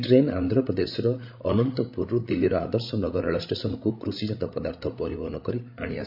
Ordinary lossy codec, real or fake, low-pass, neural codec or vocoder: MP3, 48 kbps; fake; 5.4 kHz; codec, 16 kHz, 16 kbps, FreqCodec, larger model